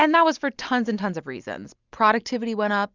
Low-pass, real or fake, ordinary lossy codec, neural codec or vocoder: 7.2 kHz; real; Opus, 64 kbps; none